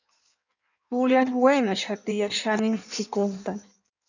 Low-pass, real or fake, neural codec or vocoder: 7.2 kHz; fake; codec, 16 kHz in and 24 kHz out, 1.1 kbps, FireRedTTS-2 codec